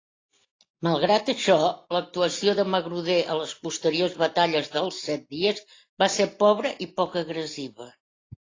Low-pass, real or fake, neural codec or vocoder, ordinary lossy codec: 7.2 kHz; real; none; AAC, 32 kbps